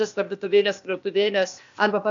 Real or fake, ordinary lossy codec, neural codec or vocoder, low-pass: fake; AAC, 64 kbps; codec, 16 kHz, 0.8 kbps, ZipCodec; 7.2 kHz